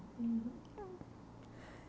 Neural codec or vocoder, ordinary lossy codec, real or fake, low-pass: none; none; real; none